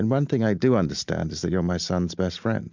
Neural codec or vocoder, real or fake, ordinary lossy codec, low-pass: none; real; MP3, 64 kbps; 7.2 kHz